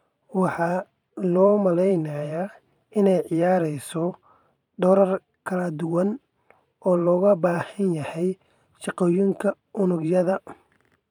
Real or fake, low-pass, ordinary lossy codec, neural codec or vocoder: fake; 19.8 kHz; none; vocoder, 44.1 kHz, 128 mel bands every 512 samples, BigVGAN v2